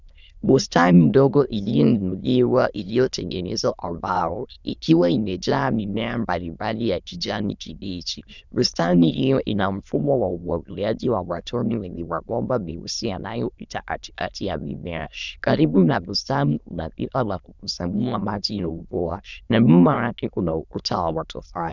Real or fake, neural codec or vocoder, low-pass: fake; autoencoder, 22.05 kHz, a latent of 192 numbers a frame, VITS, trained on many speakers; 7.2 kHz